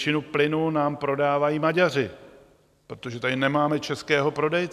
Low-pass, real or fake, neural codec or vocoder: 14.4 kHz; real; none